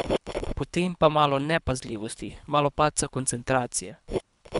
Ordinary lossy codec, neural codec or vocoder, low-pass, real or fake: none; codec, 24 kHz, 3 kbps, HILCodec; 10.8 kHz; fake